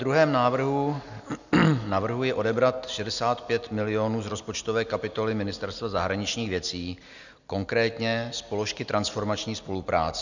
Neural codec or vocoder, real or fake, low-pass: none; real; 7.2 kHz